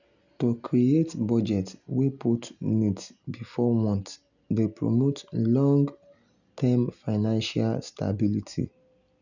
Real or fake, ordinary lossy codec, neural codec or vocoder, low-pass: real; none; none; 7.2 kHz